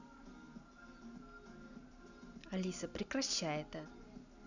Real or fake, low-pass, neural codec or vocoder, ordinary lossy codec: real; 7.2 kHz; none; none